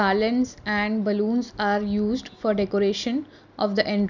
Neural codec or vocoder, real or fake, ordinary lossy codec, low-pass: none; real; none; 7.2 kHz